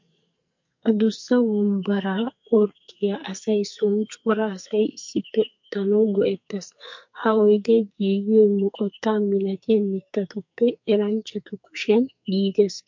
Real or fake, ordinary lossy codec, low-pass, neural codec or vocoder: fake; MP3, 48 kbps; 7.2 kHz; codec, 44.1 kHz, 2.6 kbps, SNAC